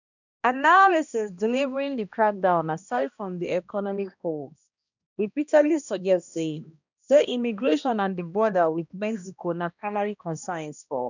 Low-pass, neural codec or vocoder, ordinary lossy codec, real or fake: 7.2 kHz; codec, 16 kHz, 1 kbps, X-Codec, HuBERT features, trained on balanced general audio; none; fake